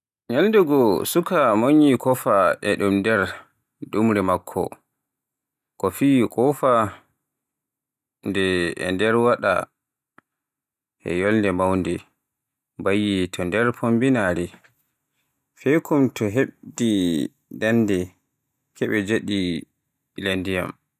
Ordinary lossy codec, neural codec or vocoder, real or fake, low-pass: none; none; real; 14.4 kHz